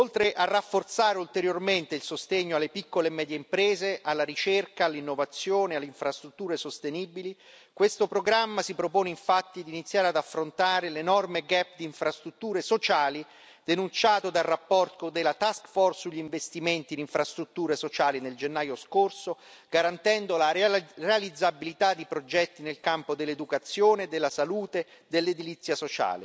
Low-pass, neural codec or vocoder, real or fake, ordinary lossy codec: none; none; real; none